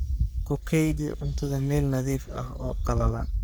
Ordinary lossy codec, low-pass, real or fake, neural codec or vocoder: none; none; fake; codec, 44.1 kHz, 3.4 kbps, Pupu-Codec